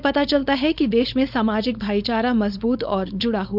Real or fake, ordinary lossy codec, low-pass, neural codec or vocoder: fake; none; 5.4 kHz; codec, 16 kHz, 4.8 kbps, FACodec